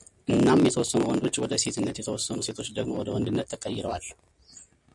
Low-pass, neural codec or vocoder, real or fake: 10.8 kHz; none; real